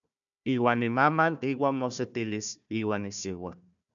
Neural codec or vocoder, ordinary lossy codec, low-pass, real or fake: codec, 16 kHz, 1 kbps, FunCodec, trained on Chinese and English, 50 frames a second; MP3, 96 kbps; 7.2 kHz; fake